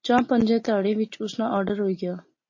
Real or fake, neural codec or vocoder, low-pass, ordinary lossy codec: real; none; 7.2 kHz; MP3, 32 kbps